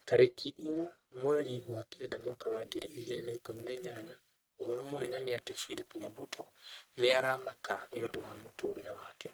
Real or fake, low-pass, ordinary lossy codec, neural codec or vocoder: fake; none; none; codec, 44.1 kHz, 1.7 kbps, Pupu-Codec